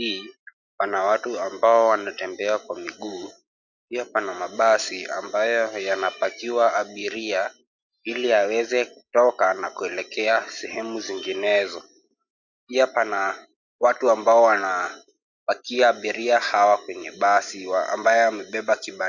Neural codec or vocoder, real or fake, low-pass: none; real; 7.2 kHz